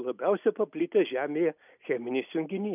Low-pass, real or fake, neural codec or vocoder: 3.6 kHz; real; none